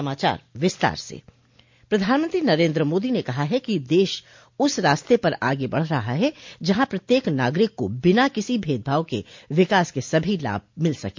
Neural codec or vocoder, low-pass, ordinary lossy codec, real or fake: none; 7.2 kHz; MP3, 48 kbps; real